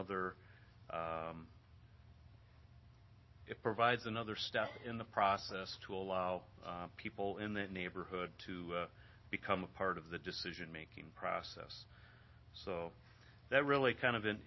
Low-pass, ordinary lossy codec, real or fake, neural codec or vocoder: 7.2 kHz; MP3, 24 kbps; real; none